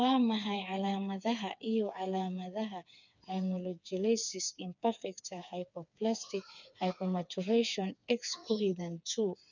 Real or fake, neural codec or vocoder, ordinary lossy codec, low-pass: fake; codec, 16 kHz, 4 kbps, FreqCodec, smaller model; none; 7.2 kHz